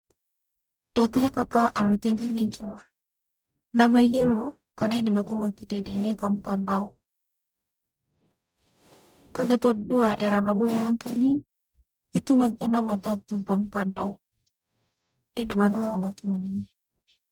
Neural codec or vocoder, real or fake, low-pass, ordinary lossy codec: codec, 44.1 kHz, 0.9 kbps, DAC; fake; 19.8 kHz; none